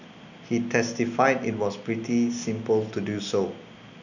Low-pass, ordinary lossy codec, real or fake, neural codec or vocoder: 7.2 kHz; none; real; none